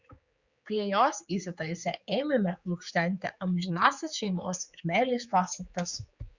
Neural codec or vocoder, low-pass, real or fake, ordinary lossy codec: codec, 16 kHz, 4 kbps, X-Codec, HuBERT features, trained on general audio; 7.2 kHz; fake; Opus, 64 kbps